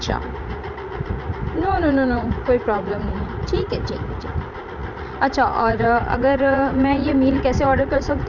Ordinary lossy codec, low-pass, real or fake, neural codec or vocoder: none; 7.2 kHz; fake; vocoder, 44.1 kHz, 80 mel bands, Vocos